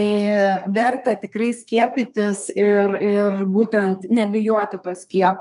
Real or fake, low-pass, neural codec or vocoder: fake; 10.8 kHz; codec, 24 kHz, 1 kbps, SNAC